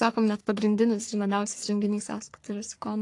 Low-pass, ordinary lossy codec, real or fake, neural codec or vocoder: 10.8 kHz; AAC, 48 kbps; fake; codec, 44.1 kHz, 3.4 kbps, Pupu-Codec